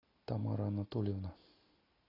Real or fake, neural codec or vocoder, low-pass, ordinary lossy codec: real; none; 5.4 kHz; AAC, 24 kbps